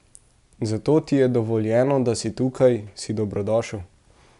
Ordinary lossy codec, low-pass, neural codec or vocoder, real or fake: none; 10.8 kHz; none; real